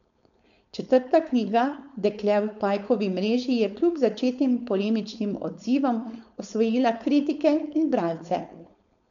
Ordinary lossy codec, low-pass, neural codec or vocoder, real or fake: none; 7.2 kHz; codec, 16 kHz, 4.8 kbps, FACodec; fake